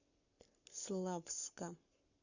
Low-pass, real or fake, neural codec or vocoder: 7.2 kHz; fake; codec, 44.1 kHz, 7.8 kbps, Pupu-Codec